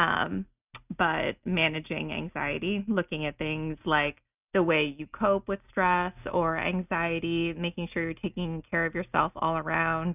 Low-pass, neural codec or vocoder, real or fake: 3.6 kHz; none; real